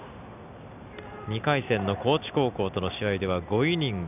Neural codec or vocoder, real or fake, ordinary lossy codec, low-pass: none; real; none; 3.6 kHz